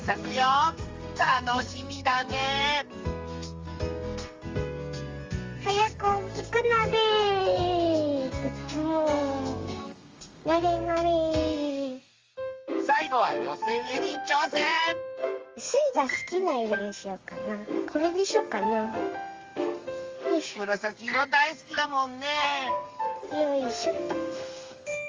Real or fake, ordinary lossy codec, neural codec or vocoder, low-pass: fake; Opus, 32 kbps; codec, 32 kHz, 1.9 kbps, SNAC; 7.2 kHz